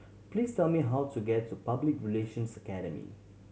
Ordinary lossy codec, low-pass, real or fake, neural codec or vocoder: none; none; real; none